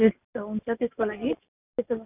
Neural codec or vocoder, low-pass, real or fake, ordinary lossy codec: none; 3.6 kHz; real; none